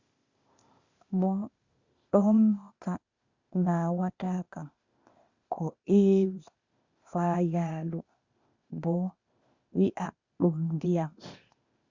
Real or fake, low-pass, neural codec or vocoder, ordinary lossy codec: fake; 7.2 kHz; codec, 16 kHz, 0.8 kbps, ZipCodec; Opus, 64 kbps